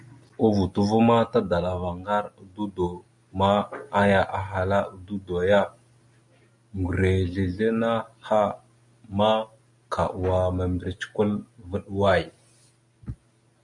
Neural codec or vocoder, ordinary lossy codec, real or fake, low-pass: none; MP3, 96 kbps; real; 10.8 kHz